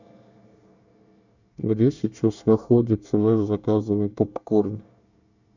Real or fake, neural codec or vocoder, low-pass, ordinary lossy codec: fake; codec, 24 kHz, 1 kbps, SNAC; 7.2 kHz; none